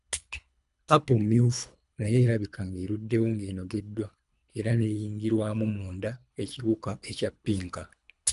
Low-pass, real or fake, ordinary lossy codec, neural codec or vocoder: 10.8 kHz; fake; none; codec, 24 kHz, 3 kbps, HILCodec